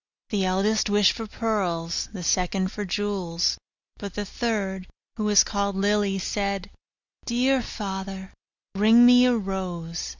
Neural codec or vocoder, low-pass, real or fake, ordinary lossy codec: none; 7.2 kHz; real; Opus, 64 kbps